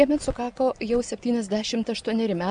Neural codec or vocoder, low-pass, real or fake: none; 9.9 kHz; real